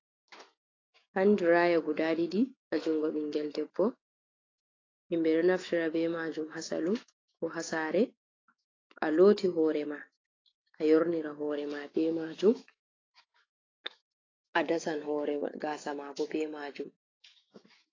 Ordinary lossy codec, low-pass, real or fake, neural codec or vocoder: AAC, 32 kbps; 7.2 kHz; fake; autoencoder, 48 kHz, 128 numbers a frame, DAC-VAE, trained on Japanese speech